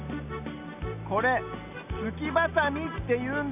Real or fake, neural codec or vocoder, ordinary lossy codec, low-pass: real; none; none; 3.6 kHz